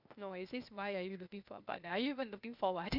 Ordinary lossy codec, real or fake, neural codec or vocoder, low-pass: none; fake; codec, 16 kHz, 0.8 kbps, ZipCodec; 5.4 kHz